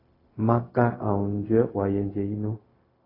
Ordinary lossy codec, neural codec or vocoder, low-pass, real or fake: AAC, 24 kbps; codec, 16 kHz, 0.4 kbps, LongCat-Audio-Codec; 5.4 kHz; fake